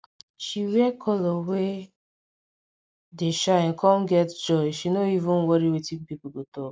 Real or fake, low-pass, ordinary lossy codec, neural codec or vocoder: real; none; none; none